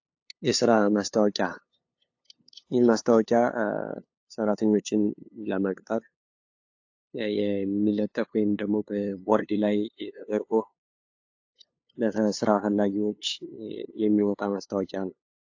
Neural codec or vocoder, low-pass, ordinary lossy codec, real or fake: codec, 16 kHz, 2 kbps, FunCodec, trained on LibriTTS, 25 frames a second; 7.2 kHz; AAC, 48 kbps; fake